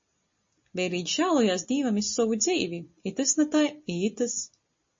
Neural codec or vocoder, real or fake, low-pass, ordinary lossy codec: none; real; 7.2 kHz; MP3, 32 kbps